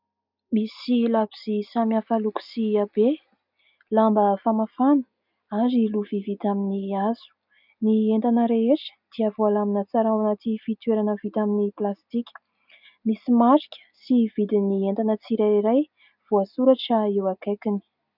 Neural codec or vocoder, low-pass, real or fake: none; 5.4 kHz; real